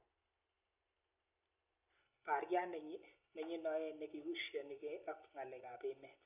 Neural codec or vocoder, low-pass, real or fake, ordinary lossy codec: none; 3.6 kHz; real; none